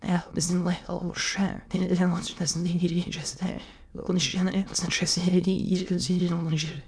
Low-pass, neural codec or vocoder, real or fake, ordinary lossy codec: none; autoencoder, 22.05 kHz, a latent of 192 numbers a frame, VITS, trained on many speakers; fake; none